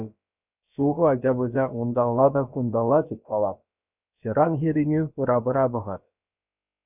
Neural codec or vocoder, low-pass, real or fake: codec, 16 kHz, about 1 kbps, DyCAST, with the encoder's durations; 3.6 kHz; fake